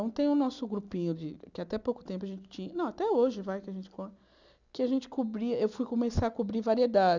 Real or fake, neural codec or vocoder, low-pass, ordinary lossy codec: real; none; 7.2 kHz; none